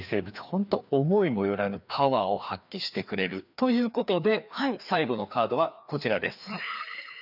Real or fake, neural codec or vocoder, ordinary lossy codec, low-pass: fake; codec, 16 kHz, 2 kbps, FreqCodec, larger model; none; 5.4 kHz